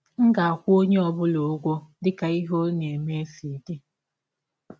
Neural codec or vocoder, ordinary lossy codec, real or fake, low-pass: none; none; real; none